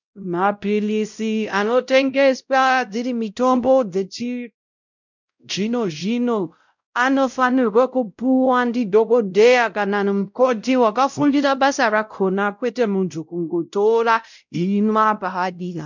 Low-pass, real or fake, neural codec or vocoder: 7.2 kHz; fake; codec, 16 kHz, 0.5 kbps, X-Codec, WavLM features, trained on Multilingual LibriSpeech